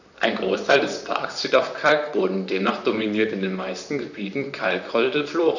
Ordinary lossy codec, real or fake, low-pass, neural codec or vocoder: none; fake; 7.2 kHz; vocoder, 44.1 kHz, 128 mel bands, Pupu-Vocoder